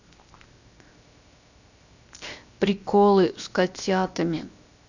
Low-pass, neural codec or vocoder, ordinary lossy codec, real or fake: 7.2 kHz; codec, 16 kHz, 0.7 kbps, FocalCodec; none; fake